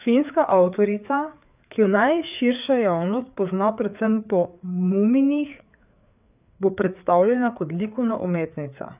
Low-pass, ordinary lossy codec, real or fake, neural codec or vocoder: 3.6 kHz; none; fake; codec, 16 kHz, 4 kbps, FreqCodec, larger model